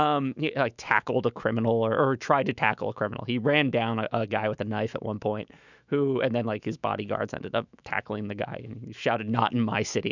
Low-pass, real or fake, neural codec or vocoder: 7.2 kHz; fake; autoencoder, 48 kHz, 128 numbers a frame, DAC-VAE, trained on Japanese speech